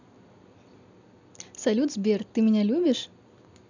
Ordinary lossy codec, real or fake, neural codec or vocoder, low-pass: none; real; none; 7.2 kHz